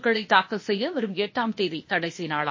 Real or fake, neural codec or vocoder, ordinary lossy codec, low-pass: fake; codec, 16 kHz, 0.8 kbps, ZipCodec; MP3, 32 kbps; 7.2 kHz